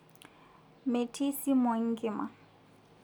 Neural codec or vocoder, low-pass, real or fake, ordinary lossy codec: none; none; real; none